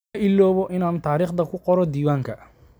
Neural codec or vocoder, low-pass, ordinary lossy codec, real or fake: none; none; none; real